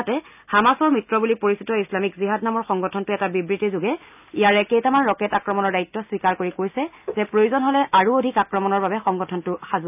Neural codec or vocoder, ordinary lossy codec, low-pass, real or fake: none; none; 3.6 kHz; real